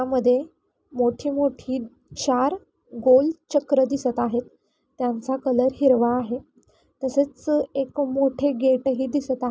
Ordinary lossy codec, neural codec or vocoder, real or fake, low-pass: none; none; real; none